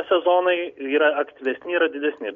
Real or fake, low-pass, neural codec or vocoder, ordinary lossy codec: real; 7.2 kHz; none; MP3, 64 kbps